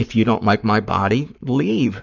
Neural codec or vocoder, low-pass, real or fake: codec, 44.1 kHz, 7.8 kbps, Pupu-Codec; 7.2 kHz; fake